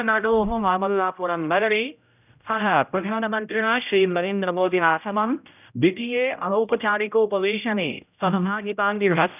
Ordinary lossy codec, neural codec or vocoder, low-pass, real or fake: none; codec, 16 kHz, 0.5 kbps, X-Codec, HuBERT features, trained on general audio; 3.6 kHz; fake